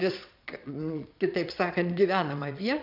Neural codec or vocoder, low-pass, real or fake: vocoder, 22.05 kHz, 80 mel bands, Vocos; 5.4 kHz; fake